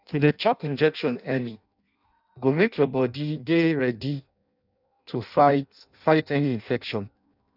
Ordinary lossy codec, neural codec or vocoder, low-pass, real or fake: none; codec, 16 kHz in and 24 kHz out, 0.6 kbps, FireRedTTS-2 codec; 5.4 kHz; fake